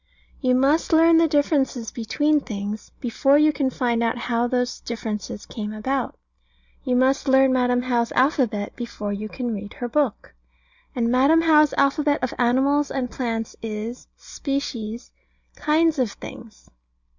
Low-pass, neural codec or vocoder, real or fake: 7.2 kHz; none; real